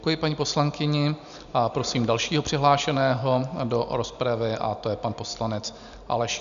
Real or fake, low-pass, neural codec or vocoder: real; 7.2 kHz; none